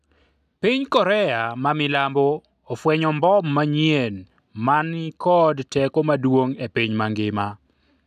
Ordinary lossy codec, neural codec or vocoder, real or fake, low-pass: none; none; real; 14.4 kHz